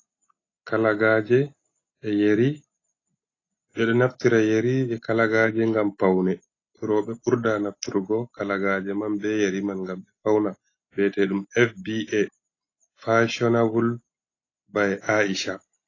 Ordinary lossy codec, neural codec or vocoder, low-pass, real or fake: AAC, 32 kbps; none; 7.2 kHz; real